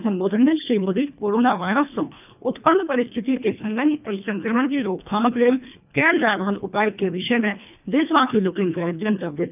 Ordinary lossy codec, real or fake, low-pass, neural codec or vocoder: none; fake; 3.6 kHz; codec, 24 kHz, 1.5 kbps, HILCodec